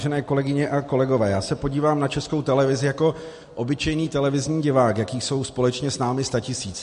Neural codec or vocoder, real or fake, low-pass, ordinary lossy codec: none; real; 14.4 kHz; MP3, 48 kbps